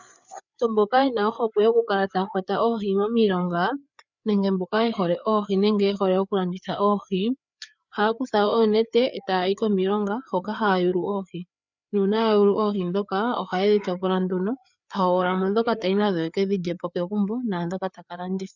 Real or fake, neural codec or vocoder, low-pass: fake; codec, 16 kHz, 4 kbps, FreqCodec, larger model; 7.2 kHz